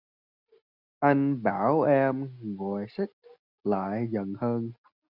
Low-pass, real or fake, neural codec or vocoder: 5.4 kHz; real; none